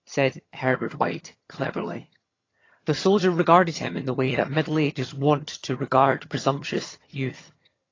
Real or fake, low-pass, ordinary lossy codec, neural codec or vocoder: fake; 7.2 kHz; AAC, 32 kbps; vocoder, 22.05 kHz, 80 mel bands, HiFi-GAN